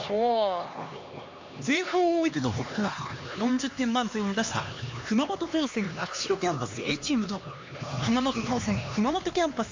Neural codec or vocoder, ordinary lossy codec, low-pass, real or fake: codec, 16 kHz, 2 kbps, X-Codec, HuBERT features, trained on LibriSpeech; MP3, 48 kbps; 7.2 kHz; fake